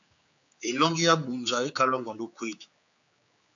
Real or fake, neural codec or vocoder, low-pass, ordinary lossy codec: fake; codec, 16 kHz, 4 kbps, X-Codec, HuBERT features, trained on general audio; 7.2 kHz; AAC, 64 kbps